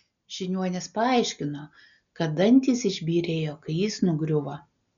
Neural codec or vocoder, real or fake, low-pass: none; real; 7.2 kHz